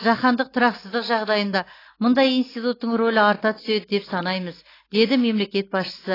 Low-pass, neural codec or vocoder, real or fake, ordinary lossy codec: 5.4 kHz; none; real; AAC, 24 kbps